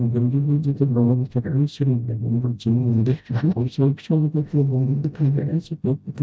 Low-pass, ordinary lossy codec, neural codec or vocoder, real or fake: none; none; codec, 16 kHz, 0.5 kbps, FreqCodec, smaller model; fake